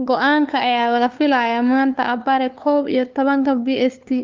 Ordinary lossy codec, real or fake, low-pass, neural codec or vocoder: Opus, 24 kbps; fake; 7.2 kHz; codec, 16 kHz, 2 kbps, FunCodec, trained on LibriTTS, 25 frames a second